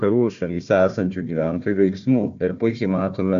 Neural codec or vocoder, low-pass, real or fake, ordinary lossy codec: codec, 16 kHz, 1 kbps, FunCodec, trained on Chinese and English, 50 frames a second; 7.2 kHz; fake; MP3, 64 kbps